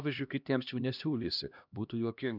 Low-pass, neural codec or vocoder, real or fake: 5.4 kHz; codec, 16 kHz, 1 kbps, X-Codec, HuBERT features, trained on LibriSpeech; fake